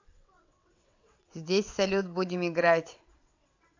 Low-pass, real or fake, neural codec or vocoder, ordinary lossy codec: 7.2 kHz; fake; vocoder, 22.05 kHz, 80 mel bands, WaveNeXt; AAC, 48 kbps